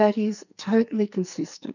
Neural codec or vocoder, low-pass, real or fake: codec, 44.1 kHz, 2.6 kbps, SNAC; 7.2 kHz; fake